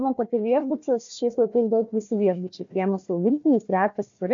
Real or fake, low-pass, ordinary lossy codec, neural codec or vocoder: fake; 7.2 kHz; MP3, 48 kbps; codec, 16 kHz, 1 kbps, FunCodec, trained on Chinese and English, 50 frames a second